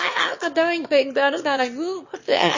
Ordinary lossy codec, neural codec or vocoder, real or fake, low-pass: MP3, 32 kbps; autoencoder, 22.05 kHz, a latent of 192 numbers a frame, VITS, trained on one speaker; fake; 7.2 kHz